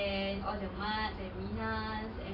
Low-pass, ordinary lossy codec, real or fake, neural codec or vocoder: 5.4 kHz; AAC, 24 kbps; real; none